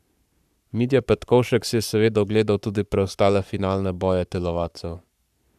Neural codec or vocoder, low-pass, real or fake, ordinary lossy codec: codec, 44.1 kHz, 7.8 kbps, Pupu-Codec; 14.4 kHz; fake; none